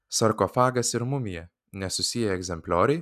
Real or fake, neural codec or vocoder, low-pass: real; none; 14.4 kHz